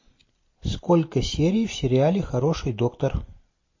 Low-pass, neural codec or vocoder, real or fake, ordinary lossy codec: 7.2 kHz; none; real; MP3, 32 kbps